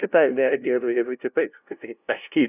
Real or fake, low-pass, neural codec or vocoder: fake; 3.6 kHz; codec, 16 kHz, 0.5 kbps, FunCodec, trained on LibriTTS, 25 frames a second